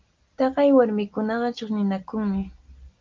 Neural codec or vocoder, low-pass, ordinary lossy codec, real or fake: none; 7.2 kHz; Opus, 24 kbps; real